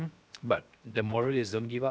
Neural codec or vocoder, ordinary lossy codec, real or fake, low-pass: codec, 16 kHz, 0.7 kbps, FocalCodec; none; fake; none